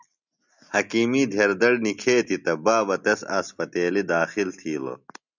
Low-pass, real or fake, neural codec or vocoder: 7.2 kHz; real; none